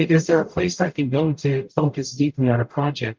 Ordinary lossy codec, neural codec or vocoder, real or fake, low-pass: Opus, 24 kbps; codec, 44.1 kHz, 0.9 kbps, DAC; fake; 7.2 kHz